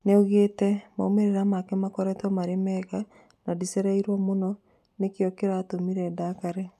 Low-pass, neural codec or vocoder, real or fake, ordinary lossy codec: 14.4 kHz; none; real; none